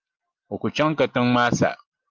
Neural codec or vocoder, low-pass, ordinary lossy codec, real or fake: none; 7.2 kHz; Opus, 32 kbps; real